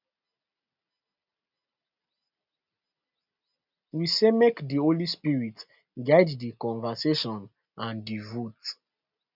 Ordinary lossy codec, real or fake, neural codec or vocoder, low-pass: none; real; none; 5.4 kHz